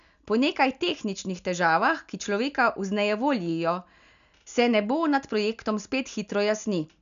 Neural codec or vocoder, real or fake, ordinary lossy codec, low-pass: none; real; none; 7.2 kHz